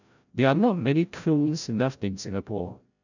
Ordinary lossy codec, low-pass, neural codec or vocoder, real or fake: none; 7.2 kHz; codec, 16 kHz, 0.5 kbps, FreqCodec, larger model; fake